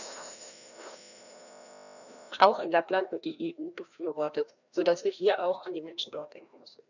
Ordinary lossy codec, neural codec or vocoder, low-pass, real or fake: none; codec, 16 kHz, 1 kbps, FreqCodec, larger model; 7.2 kHz; fake